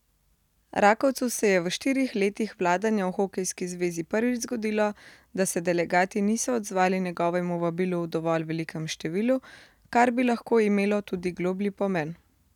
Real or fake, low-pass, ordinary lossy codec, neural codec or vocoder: real; 19.8 kHz; none; none